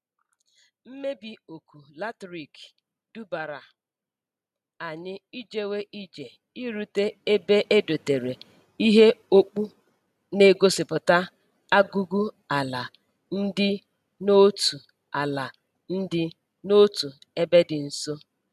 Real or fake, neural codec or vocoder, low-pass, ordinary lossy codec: real; none; 14.4 kHz; none